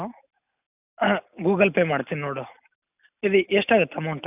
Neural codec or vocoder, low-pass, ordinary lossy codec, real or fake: none; 3.6 kHz; none; real